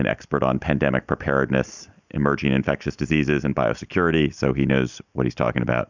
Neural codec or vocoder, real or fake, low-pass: none; real; 7.2 kHz